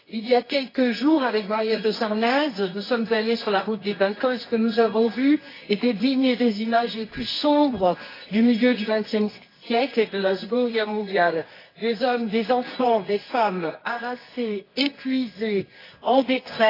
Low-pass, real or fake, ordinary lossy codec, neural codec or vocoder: 5.4 kHz; fake; AAC, 24 kbps; codec, 24 kHz, 0.9 kbps, WavTokenizer, medium music audio release